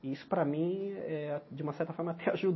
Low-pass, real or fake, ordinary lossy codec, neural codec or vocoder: 7.2 kHz; real; MP3, 24 kbps; none